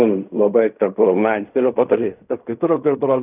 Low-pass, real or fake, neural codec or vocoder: 3.6 kHz; fake; codec, 16 kHz in and 24 kHz out, 0.4 kbps, LongCat-Audio-Codec, fine tuned four codebook decoder